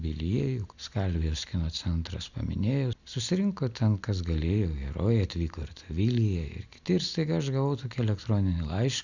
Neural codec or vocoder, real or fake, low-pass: none; real; 7.2 kHz